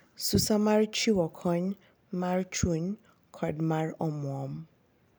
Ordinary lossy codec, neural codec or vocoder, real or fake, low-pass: none; none; real; none